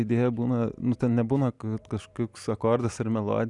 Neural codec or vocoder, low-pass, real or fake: none; 10.8 kHz; real